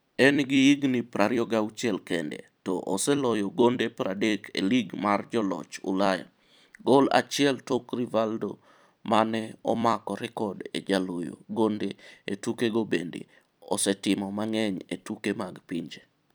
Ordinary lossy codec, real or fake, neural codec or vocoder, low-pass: none; fake; vocoder, 44.1 kHz, 128 mel bands every 256 samples, BigVGAN v2; none